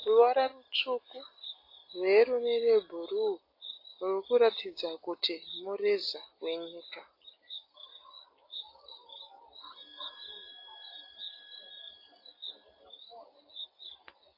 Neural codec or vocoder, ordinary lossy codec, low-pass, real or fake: none; AAC, 32 kbps; 5.4 kHz; real